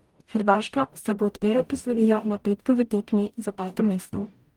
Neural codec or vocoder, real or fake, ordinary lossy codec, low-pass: codec, 44.1 kHz, 0.9 kbps, DAC; fake; Opus, 32 kbps; 19.8 kHz